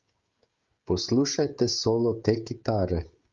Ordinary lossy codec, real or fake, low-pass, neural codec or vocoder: Opus, 32 kbps; fake; 7.2 kHz; codec, 16 kHz, 16 kbps, FreqCodec, smaller model